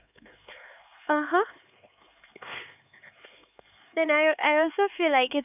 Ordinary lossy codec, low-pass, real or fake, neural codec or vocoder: none; 3.6 kHz; fake; codec, 16 kHz, 4 kbps, X-Codec, HuBERT features, trained on LibriSpeech